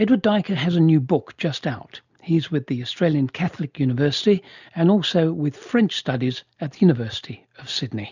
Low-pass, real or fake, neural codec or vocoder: 7.2 kHz; real; none